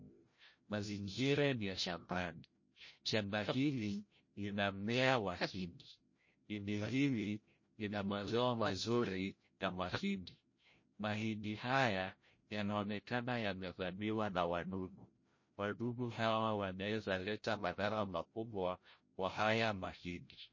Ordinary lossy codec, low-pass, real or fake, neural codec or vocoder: MP3, 32 kbps; 7.2 kHz; fake; codec, 16 kHz, 0.5 kbps, FreqCodec, larger model